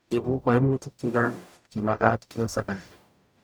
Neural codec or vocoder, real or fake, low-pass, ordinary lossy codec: codec, 44.1 kHz, 0.9 kbps, DAC; fake; none; none